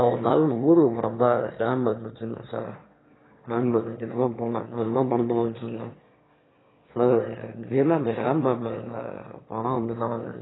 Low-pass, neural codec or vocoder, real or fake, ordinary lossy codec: 7.2 kHz; autoencoder, 22.05 kHz, a latent of 192 numbers a frame, VITS, trained on one speaker; fake; AAC, 16 kbps